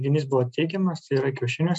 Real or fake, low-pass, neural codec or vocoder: real; 10.8 kHz; none